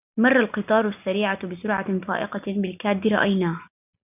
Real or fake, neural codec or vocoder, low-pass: real; none; 3.6 kHz